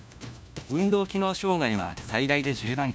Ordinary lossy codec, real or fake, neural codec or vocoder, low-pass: none; fake; codec, 16 kHz, 1 kbps, FunCodec, trained on LibriTTS, 50 frames a second; none